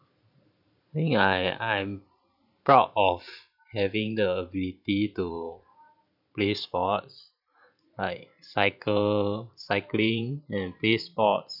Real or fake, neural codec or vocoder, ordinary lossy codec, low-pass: fake; vocoder, 44.1 kHz, 128 mel bands every 512 samples, BigVGAN v2; none; 5.4 kHz